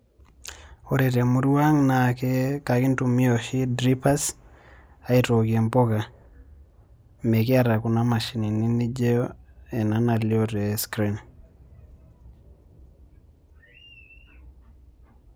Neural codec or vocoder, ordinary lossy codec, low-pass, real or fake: none; none; none; real